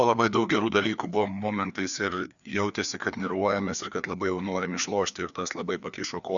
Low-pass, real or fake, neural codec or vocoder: 7.2 kHz; fake; codec, 16 kHz, 2 kbps, FreqCodec, larger model